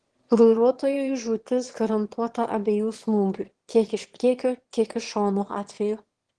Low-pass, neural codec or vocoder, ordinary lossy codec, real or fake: 9.9 kHz; autoencoder, 22.05 kHz, a latent of 192 numbers a frame, VITS, trained on one speaker; Opus, 16 kbps; fake